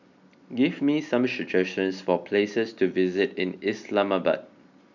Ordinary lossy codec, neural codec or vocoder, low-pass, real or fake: none; none; 7.2 kHz; real